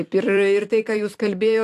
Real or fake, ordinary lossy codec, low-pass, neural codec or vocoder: fake; AAC, 64 kbps; 14.4 kHz; vocoder, 44.1 kHz, 128 mel bands every 256 samples, BigVGAN v2